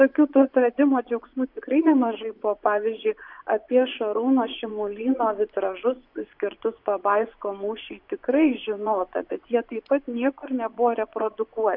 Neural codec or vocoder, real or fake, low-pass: vocoder, 24 kHz, 100 mel bands, Vocos; fake; 5.4 kHz